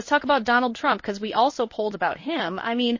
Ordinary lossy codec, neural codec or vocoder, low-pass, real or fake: MP3, 32 kbps; codec, 16 kHz in and 24 kHz out, 1 kbps, XY-Tokenizer; 7.2 kHz; fake